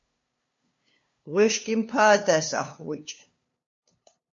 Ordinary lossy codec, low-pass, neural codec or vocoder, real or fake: MP3, 48 kbps; 7.2 kHz; codec, 16 kHz, 2 kbps, FunCodec, trained on LibriTTS, 25 frames a second; fake